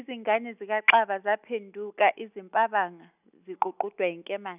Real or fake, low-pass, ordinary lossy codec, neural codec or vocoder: real; 3.6 kHz; none; none